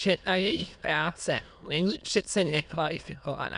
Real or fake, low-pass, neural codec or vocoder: fake; 9.9 kHz; autoencoder, 22.05 kHz, a latent of 192 numbers a frame, VITS, trained on many speakers